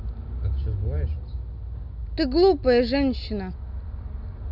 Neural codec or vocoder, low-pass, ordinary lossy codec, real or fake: none; 5.4 kHz; none; real